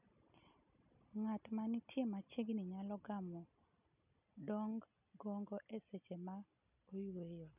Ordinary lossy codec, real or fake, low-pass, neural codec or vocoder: none; real; 3.6 kHz; none